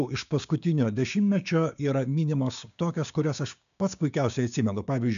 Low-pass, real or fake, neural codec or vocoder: 7.2 kHz; fake; codec, 16 kHz, 6 kbps, DAC